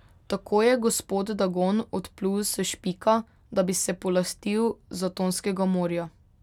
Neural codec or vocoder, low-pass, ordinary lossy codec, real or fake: none; 19.8 kHz; none; real